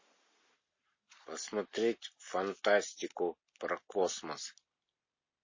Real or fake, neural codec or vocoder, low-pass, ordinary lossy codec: real; none; 7.2 kHz; MP3, 32 kbps